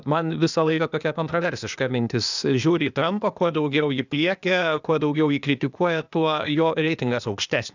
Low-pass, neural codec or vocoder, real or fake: 7.2 kHz; codec, 16 kHz, 0.8 kbps, ZipCodec; fake